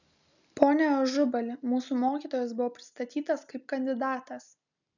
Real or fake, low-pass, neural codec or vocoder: real; 7.2 kHz; none